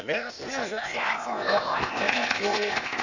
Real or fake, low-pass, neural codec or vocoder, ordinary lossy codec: fake; 7.2 kHz; codec, 16 kHz, 0.8 kbps, ZipCodec; none